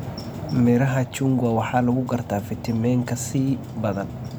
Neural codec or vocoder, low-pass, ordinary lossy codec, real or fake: none; none; none; real